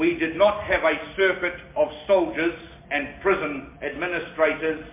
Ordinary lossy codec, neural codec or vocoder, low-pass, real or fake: MP3, 24 kbps; none; 3.6 kHz; real